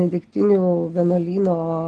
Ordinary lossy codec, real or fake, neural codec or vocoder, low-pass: Opus, 16 kbps; real; none; 10.8 kHz